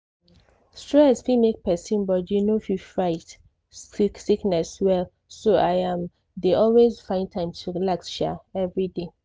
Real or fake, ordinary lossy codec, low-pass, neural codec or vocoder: real; none; none; none